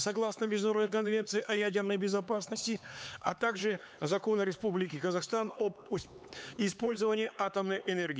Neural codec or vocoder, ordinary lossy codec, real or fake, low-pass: codec, 16 kHz, 4 kbps, X-Codec, HuBERT features, trained on LibriSpeech; none; fake; none